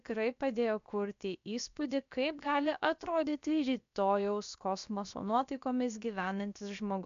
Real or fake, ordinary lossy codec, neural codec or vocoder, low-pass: fake; MP3, 64 kbps; codec, 16 kHz, about 1 kbps, DyCAST, with the encoder's durations; 7.2 kHz